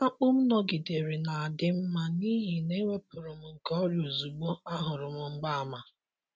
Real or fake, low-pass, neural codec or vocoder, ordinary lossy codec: real; none; none; none